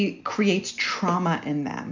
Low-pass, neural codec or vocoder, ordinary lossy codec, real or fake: 7.2 kHz; none; MP3, 64 kbps; real